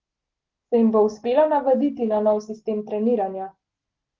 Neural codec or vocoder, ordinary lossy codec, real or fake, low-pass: none; Opus, 16 kbps; real; 7.2 kHz